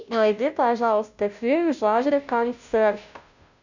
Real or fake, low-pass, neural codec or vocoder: fake; 7.2 kHz; codec, 16 kHz, 0.5 kbps, FunCodec, trained on Chinese and English, 25 frames a second